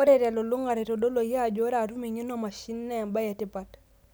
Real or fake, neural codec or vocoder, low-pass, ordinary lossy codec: real; none; none; none